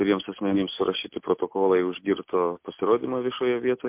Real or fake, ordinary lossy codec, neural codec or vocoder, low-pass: real; MP3, 32 kbps; none; 3.6 kHz